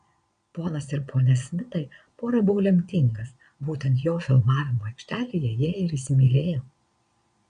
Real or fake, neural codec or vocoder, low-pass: fake; vocoder, 22.05 kHz, 80 mel bands, Vocos; 9.9 kHz